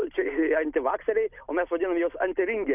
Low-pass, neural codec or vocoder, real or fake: 3.6 kHz; none; real